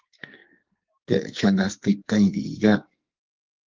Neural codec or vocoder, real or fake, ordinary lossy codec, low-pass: codec, 44.1 kHz, 2.6 kbps, SNAC; fake; Opus, 32 kbps; 7.2 kHz